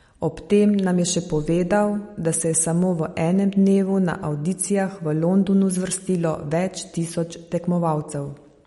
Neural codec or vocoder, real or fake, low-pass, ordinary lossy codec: none; real; 19.8 kHz; MP3, 48 kbps